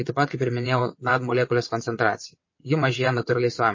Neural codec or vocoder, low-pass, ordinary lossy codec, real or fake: vocoder, 22.05 kHz, 80 mel bands, WaveNeXt; 7.2 kHz; MP3, 32 kbps; fake